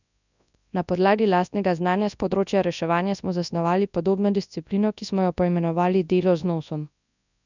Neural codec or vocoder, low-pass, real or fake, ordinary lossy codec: codec, 24 kHz, 0.9 kbps, WavTokenizer, large speech release; 7.2 kHz; fake; none